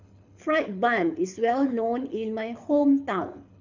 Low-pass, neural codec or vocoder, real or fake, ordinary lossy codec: 7.2 kHz; codec, 24 kHz, 6 kbps, HILCodec; fake; none